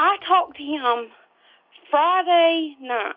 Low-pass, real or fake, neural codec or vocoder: 5.4 kHz; real; none